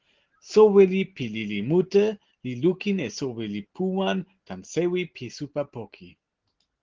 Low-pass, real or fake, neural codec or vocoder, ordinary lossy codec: 7.2 kHz; real; none; Opus, 16 kbps